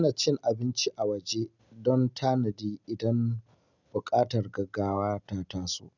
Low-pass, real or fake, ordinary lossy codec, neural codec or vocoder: 7.2 kHz; real; none; none